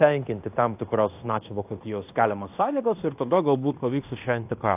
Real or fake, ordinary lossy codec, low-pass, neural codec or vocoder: fake; AAC, 32 kbps; 3.6 kHz; codec, 16 kHz in and 24 kHz out, 0.9 kbps, LongCat-Audio-Codec, fine tuned four codebook decoder